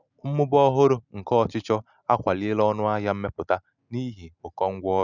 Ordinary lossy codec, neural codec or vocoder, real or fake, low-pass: none; none; real; 7.2 kHz